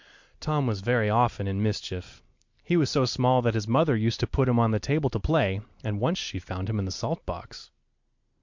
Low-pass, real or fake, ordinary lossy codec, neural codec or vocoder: 7.2 kHz; real; MP3, 64 kbps; none